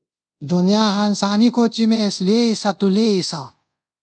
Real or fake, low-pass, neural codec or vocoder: fake; 9.9 kHz; codec, 24 kHz, 0.5 kbps, DualCodec